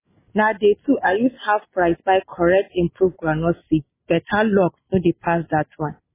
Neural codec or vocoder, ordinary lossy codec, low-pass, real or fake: none; MP3, 16 kbps; 3.6 kHz; real